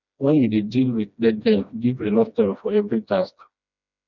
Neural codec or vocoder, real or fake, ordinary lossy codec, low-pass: codec, 16 kHz, 1 kbps, FreqCodec, smaller model; fake; none; 7.2 kHz